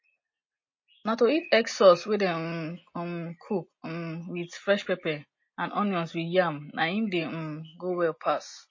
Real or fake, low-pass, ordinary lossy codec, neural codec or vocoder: real; 7.2 kHz; MP3, 32 kbps; none